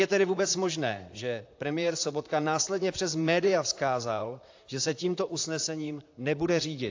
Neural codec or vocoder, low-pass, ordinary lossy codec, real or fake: vocoder, 44.1 kHz, 128 mel bands, Pupu-Vocoder; 7.2 kHz; AAC, 48 kbps; fake